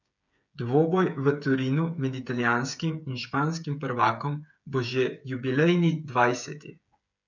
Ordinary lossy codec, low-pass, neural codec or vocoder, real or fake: none; 7.2 kHz; codec, 16 kHz, 8 kbps, FreqCodec, smaller model; fake